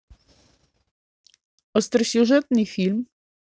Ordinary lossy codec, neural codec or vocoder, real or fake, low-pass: none; none; real; none